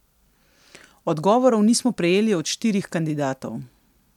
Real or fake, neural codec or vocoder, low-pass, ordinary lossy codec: real; none; 19.8 kHz; MP3, 96 kbps